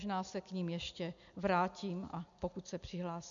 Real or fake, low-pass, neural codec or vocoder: real; 7.2 kHz; none